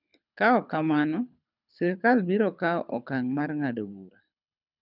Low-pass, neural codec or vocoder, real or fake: 5.4 kHz; codec, 24 kHz, 6 kbps, HILCodec; fake